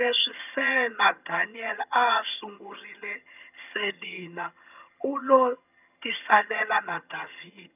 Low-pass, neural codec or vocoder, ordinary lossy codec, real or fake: 3.6 kHz; vocoder, 22.05 kHz, 80 mel bands, HiFi-GAN; none; fake